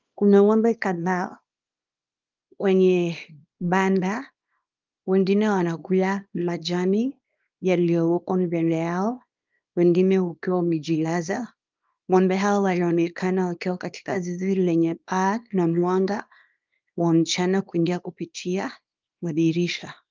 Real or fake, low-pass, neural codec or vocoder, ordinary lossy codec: fake; 7.2 kHz; codec, 24 kHz, 0.9 kbps, WavTokenizer, small release; Opus, 24 kbps